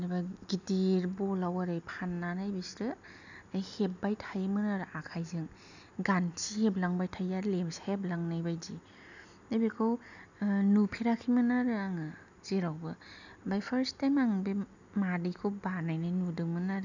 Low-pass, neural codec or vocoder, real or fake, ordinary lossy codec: 7.2 kHz; none; real; none